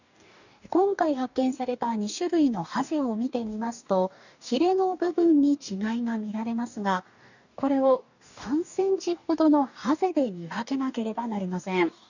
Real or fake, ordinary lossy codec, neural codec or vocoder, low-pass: fake; none; codec, 44.1 kHz, 2.6 kbps, DAC; 7.2 kHz